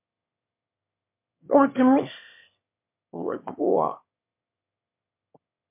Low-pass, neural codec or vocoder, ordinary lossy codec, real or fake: 3.6 kHz; autoencoder, 22.05 kHz, a latent of 192 numbers a frame, VITS, trained on one speaker; MP3, 24 kbps; fake